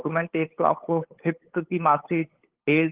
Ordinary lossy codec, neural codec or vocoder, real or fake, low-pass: Opus, 16 kbps; codec, 16 kHz, 8 kbps, FunCodec, trained on LibriTTS, 25 frames a second; fake; 3.6 kHz